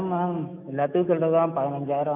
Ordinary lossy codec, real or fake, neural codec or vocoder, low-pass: none; fake; autoencoder, 48 kHz, 128 numbers a frame, DAC-VAE, trained on Japanese speech; 3.6 kHz